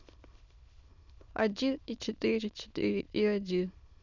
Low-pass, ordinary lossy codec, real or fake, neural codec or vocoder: 7.2 kHz; none; fake; autoencoder, 22.05 kHz, a latent of 192 numbers a frame, VITS, trained on many speakers